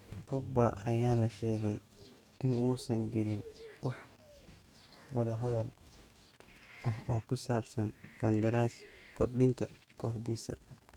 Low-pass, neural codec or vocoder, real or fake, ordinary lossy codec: 19.8 kHz; codec, 44.1 kHz, 2.6 kbps, DAC; fake; none